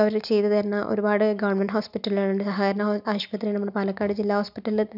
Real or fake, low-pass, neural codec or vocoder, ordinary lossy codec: real; 5.4 kHz; none; none